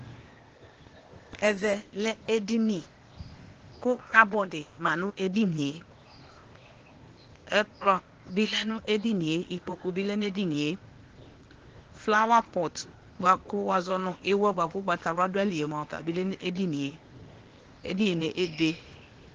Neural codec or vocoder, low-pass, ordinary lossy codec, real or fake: codec, 16 kHz, 0.8 kbps, ZipCodec; 7.2 kHz; Opus, 16 kbps; fake